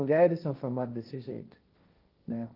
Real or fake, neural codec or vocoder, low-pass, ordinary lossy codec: fake; codec, 16 kHz, 1.1 kbps, Voila-Tokenizer; 5.4 kHz; Opus, 32 kbps